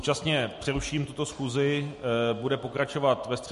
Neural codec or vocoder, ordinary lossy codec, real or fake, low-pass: none; MP3, 48 kbps; real; 14.4 kHz